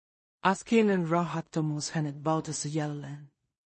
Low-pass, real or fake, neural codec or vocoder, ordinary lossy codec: 10.8 kHz; fake; codec, 16 kHz in and 24 kHz out, 0.4 kbps, LongCat-Audio-Codec, two codebook decoder; MP3, 32 kbps